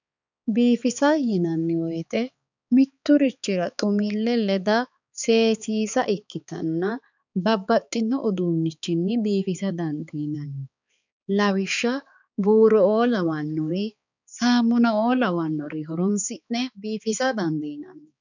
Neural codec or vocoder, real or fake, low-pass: codec, 16 kHz, 4 kbps, X-Codec, HuBERT features, trained on balanced general audio; fake; 7.2 kHz